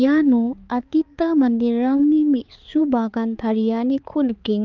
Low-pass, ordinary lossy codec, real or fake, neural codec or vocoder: 7.2 kHz; Opus, 24 kbps; fake; codec, 16 kHz, 4 kbps, X-Codec, HuBERT features, trained on balanced general audio